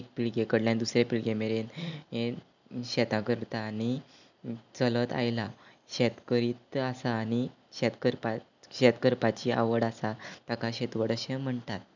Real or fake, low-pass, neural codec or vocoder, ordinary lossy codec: real; 7.2 kHz; none; none